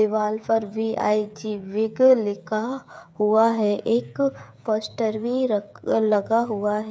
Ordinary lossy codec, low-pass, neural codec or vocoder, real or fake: none; none; codec, 16 kHz, 8 kbps, FreqCodec, smaller model; fake